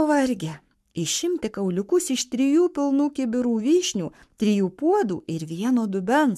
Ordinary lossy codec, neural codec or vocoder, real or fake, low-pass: MP3, 96 kbps; codec, 44.1 kHz, 7.8 kbps, Pupu-Codec; fake; 14.4 kHz